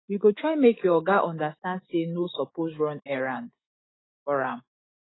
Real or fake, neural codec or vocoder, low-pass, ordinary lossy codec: fake; autoencoder, 48 kHz, 128 numbers a frame, DAC-VAE, trained on Japanese speech; 7.2 kHz; AAC, 16 kbps